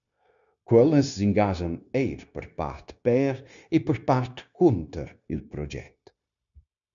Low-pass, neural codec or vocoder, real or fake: 7.2 kHz; codec, 16 kHz, 0.9 kbps, LongCat-Audio-Codec; fake